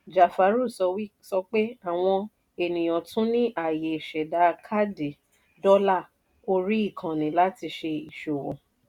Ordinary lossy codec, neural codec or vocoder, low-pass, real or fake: none; none; 19.8 kHz; real